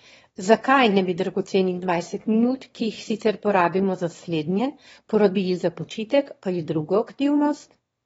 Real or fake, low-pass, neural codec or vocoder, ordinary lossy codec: fake; 9.9 kHz; autoencoder, 22.05 kHz, a latent of 192 numbers a frame, VITS, trained on one speaker; AAC, 24 kbps